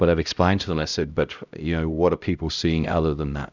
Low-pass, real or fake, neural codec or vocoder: 7.2 kHz; fake; codec, 16 kHz, 0.5 kbps, X-Codec, HuBERT features, trained on LibriSpeech